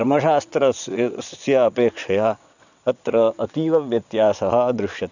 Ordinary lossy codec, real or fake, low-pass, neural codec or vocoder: none; fake; 7.2 kHz; vocoder, 22.05 kHz, 80 mel bands, WaveNeXt